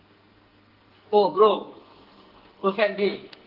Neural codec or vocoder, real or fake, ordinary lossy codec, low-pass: codec, 44.1 kHz, 2.6 kbps, SNAC; fake; Opus, 16 kbps; 5.4 kHz